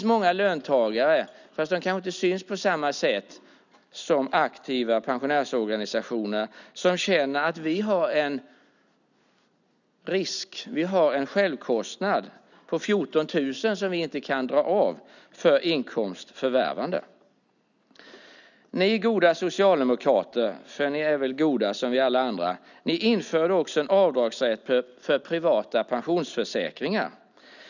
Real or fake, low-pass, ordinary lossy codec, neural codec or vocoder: real; 7.2 kHz; none; none